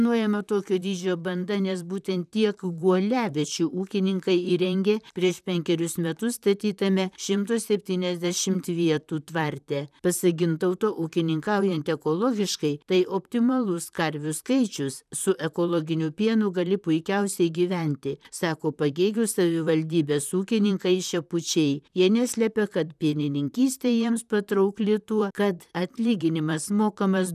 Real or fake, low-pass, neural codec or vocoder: fake; 14.4 kHz; vocoder, 44.1 kHz, 128 mel bands, Pupu-Vocoder